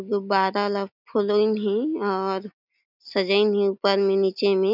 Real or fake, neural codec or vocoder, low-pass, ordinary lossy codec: real; none; 5.4 kHz; none